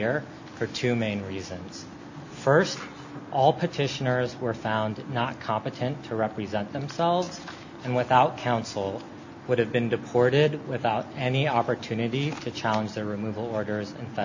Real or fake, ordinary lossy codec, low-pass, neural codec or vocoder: real; AAC, 48 kbps; 7.2 kHz; none